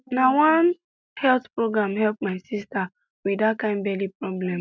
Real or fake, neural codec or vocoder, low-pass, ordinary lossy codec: real; none; none; none